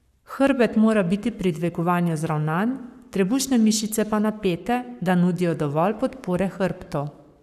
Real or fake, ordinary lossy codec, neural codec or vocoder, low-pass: fake; none; codec, 44.1 kHz, 7.8 kbps, Pupu-Codec; 14.4 kHz